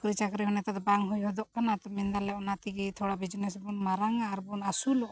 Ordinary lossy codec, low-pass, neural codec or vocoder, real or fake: none; none; none; real